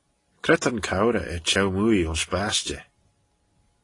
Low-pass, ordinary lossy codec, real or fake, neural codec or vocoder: 10.8 kHz; AAC, 32 kbps; real; none